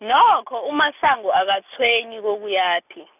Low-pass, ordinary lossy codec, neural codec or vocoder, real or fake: 3.6 kHz; none; none; real